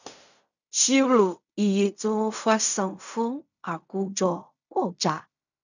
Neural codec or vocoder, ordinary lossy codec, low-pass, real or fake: codec, 16 kHz in and 24 kHz out, 0.4 kbps, LongCat-Audio-Codec, fine tuned four codebook decoder; none; 7.2 kHz; fake